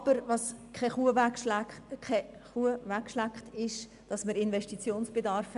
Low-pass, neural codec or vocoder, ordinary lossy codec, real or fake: 10.8 kHz; none; none; real